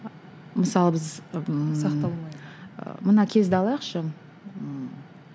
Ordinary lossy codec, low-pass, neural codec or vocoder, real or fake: none; none; none; real